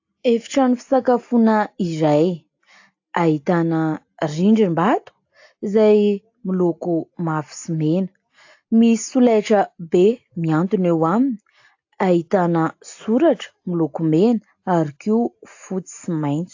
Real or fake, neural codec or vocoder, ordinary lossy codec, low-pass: real; none; AAC, 48 kbps; 7.2 kHz